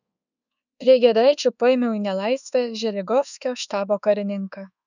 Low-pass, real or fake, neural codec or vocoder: 7.2 kHz; fake; codec, 24 kHz, 1.2 kbps, DualCodec